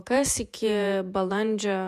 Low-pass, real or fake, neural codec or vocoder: 14.4 kHz; fake; vocoder, 48 kHz, 128 mel bands, Vocos